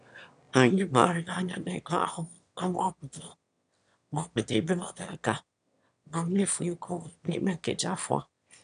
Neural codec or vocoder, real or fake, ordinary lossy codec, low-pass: autoencoder, 22.05 kHz, a latent of 192 numbers a frame, VITS, trained on one speaker; fake; none; 9.9 kHz